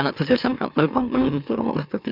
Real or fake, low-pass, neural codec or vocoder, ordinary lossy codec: fake; 5.4 kHz; autoencoder, 44.1 kHz, a latent of 192 numbers a frame, MeloTTS; none